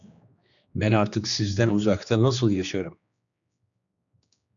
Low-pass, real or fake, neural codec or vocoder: 7.2 kHz; fake; codec, 16 kHz, 2 kbps, X-Codec, HuBERT features, trained on general audio